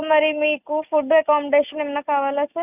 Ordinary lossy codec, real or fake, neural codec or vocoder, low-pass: none; real; none; 3.6 kHz